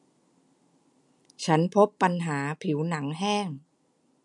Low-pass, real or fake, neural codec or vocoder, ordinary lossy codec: 10.8 kHz; real; none; none